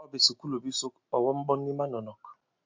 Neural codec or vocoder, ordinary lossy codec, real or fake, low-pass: none; MP3, 48 kbps; real; 7.2 kHz